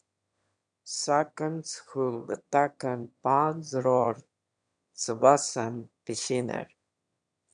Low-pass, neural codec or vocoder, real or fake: 9.9 kHz; autoencoder, 22.05 kHz, a latent of 192 numbers a frame, VITS, trained on one speaker; fake